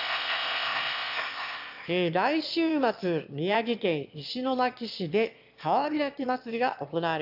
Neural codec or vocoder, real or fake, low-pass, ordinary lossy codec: autoencoder, 22.05 kHz, a latent of 192 numbers a frame, VITS, trained on one speaker; fake; 5.4 kHz; AAC, 48 kbps